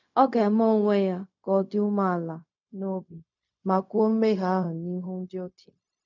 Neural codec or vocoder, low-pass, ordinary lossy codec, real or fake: codec, 16 kHz, 0.4 kbps, LongCat-Audio-Codec; 7.2 kHz; none; fake